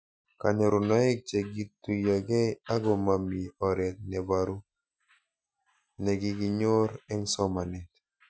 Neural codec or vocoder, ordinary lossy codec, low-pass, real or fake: none; none; none; real